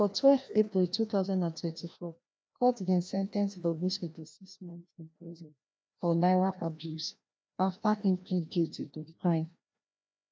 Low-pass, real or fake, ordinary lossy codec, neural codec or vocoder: none; fake; none; codec, 16 kHz, 1 kbps, FreqCodec, larger model